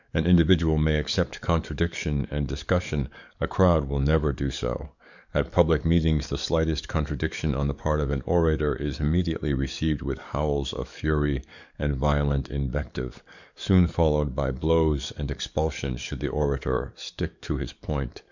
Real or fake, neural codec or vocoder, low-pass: fake; codec, 44.1 kHz, 7.8 kbps, DAC; 7.2 kHz